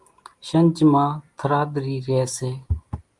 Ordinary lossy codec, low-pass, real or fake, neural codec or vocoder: Opus, 32 kbps; 10.8 kHz; real; none